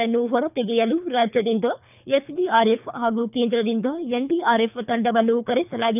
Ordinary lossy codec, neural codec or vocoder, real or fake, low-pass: none; codec, 24 kHz, 3 kbps, HILCodec; fake; 3.6 kHz